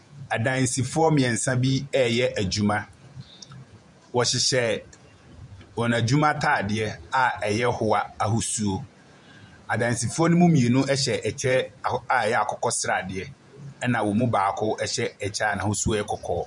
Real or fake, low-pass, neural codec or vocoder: fake; 10.8 kHz; vocoder, 48 kHz, 128 mel bands, Vocos